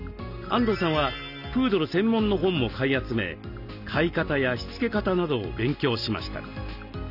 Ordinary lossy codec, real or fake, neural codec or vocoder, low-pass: none; real; none; 5.4 kHz